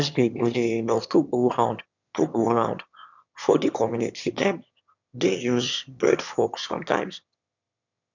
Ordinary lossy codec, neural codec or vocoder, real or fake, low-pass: none; autoencoder, 22.05 kHz, a latent of 192 numbers a frame, VITS, trained on one speaker; fake; 7.2 kHz